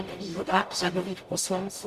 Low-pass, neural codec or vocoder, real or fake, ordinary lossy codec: 14.4 kHz; codec, 44.1 kHz, 0.9 kbps, DAC; fake; Opus, 64 kbps